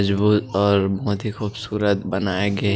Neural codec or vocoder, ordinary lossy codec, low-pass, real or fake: none; none; none; real